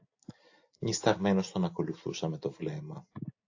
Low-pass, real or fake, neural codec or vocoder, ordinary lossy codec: 7.2 kHz; real; none; AAC, 48 kbps